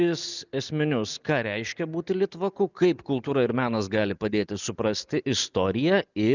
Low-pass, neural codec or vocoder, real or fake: 7.2 kHz; none; real